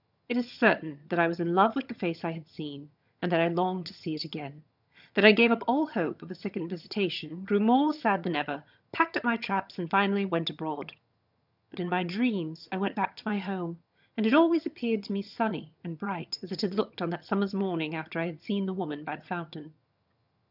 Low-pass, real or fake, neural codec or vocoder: 5.4 kHz; fake; vocoder, 22.05 kHz, 80 mel bands, HiFi-GAN